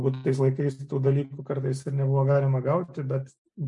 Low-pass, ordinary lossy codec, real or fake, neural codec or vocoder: 10.8 kHz; MP3, 64 kbps; real; none